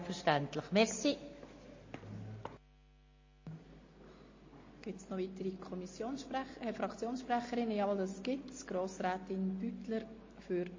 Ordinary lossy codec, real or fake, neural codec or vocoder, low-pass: MP3, 32 kbps; real; none; 7.2 kHz